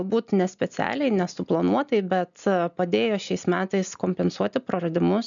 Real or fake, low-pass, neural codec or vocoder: real; 7.2 kHz; none